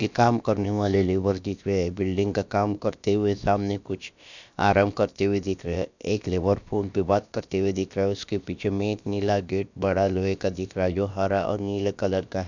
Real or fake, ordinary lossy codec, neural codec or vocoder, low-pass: fake; none; codec, 16 kHz, about 1 kbps, DyCAST, with the encoder's durations; 7.2 kHz